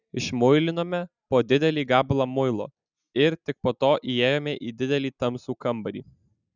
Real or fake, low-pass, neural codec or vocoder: real; 7.2 kHz; none